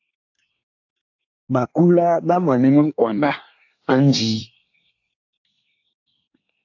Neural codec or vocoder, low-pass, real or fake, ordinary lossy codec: codec, 24 kHz, 1 kbps, SNAC; 7.2 kHz; fake; AAC, 48 kbps